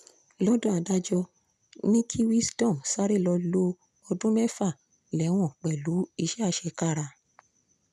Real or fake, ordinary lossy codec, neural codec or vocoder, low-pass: real; none; none; none